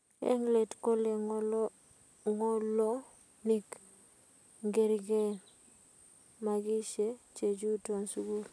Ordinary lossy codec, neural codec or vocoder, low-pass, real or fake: none; none; none; real